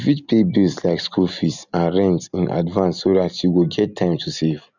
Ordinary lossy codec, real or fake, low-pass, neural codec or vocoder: none; real; 7.2 kHz; none